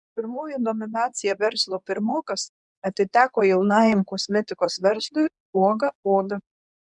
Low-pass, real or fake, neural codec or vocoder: 10.8 kHz; fake; codec, 24 kHz, 0.9 kbps, WavTokenizer, medium speech release version 2